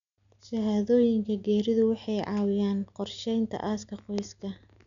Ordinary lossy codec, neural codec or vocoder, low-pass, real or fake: none; none; 7.2 kHz; real